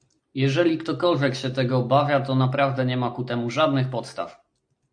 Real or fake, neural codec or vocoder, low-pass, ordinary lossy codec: real; none; 9.9 kHz; AAC, 64 kbps